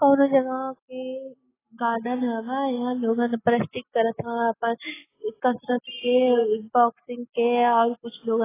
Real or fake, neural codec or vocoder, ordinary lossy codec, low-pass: real; none; AAC, 16 kbps; 3.6 kHz